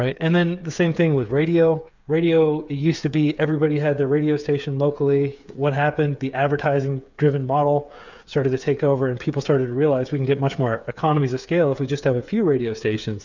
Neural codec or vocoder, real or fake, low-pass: codec, 16 kHz, 8 kbps, FreqCodec, smaller model; fake; 7.2 kHz